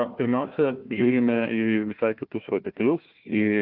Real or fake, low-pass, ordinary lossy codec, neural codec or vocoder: fake; 5.4 kHz; Opus, 32 kbps; codec, 16 kHz, 1 kbps, FunCodec, trained on Chinese and English, 50 frames a second